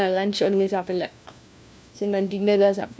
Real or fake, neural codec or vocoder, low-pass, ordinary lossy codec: fake; codec, 16 kHz, 1 kbps, FunCodec, trained on LibriTTS, 50 frames a second; none; none